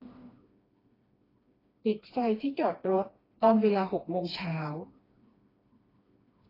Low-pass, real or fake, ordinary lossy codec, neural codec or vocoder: 5.4 kHz; fake; AAC, 24 kbps; codec, 16 kHz, 2 kbps, FreqCodec, smaller model